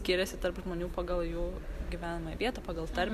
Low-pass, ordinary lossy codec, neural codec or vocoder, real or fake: 14.4 kHz; Opus, 64 kbps; none; real